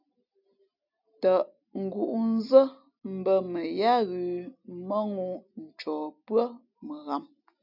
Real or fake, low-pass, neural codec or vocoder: real; 5.4 kHz; none